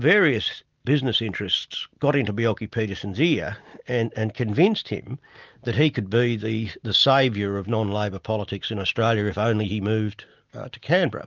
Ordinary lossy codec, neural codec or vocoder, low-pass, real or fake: Opus, 24 kbps; none; 7.2 kHz; real